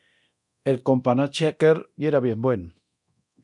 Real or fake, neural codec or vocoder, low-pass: fake; codec, 24 kHz, 0.9 kbps, DualCodec; 10.8 kHz